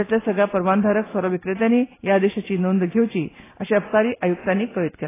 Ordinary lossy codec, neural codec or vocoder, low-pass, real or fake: AAC, 16 kbps; none; 3.6 kHz; real